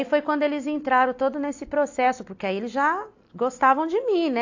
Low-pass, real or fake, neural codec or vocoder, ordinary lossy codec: 7.2 kHz; real; none; AAC, 48 kbps